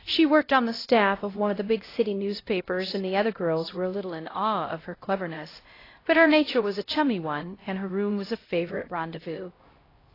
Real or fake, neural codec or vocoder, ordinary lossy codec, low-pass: fake; codec, 16 kHz, 0.5 kbps, X-Codec, HuBERT features, trained on LibriSpeech; AAC, 24 kbps; 5.4 kHz